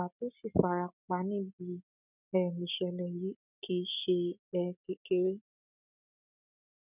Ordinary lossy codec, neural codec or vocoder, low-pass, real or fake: none; none; 3.6 kHz; real